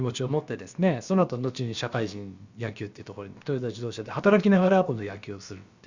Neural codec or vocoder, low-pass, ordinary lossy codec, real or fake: codec, 16 kHz, about 1 kbps, DyCAST, with the encoder's durations; 7.2 kHz; Opus, 64 kbps; fake